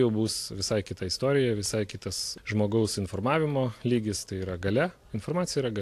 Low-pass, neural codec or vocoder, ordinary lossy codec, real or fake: 14.4 kHz; none; AAC, 64 kbps; real